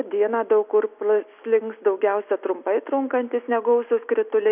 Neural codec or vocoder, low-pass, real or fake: none; 3.6 kHz; real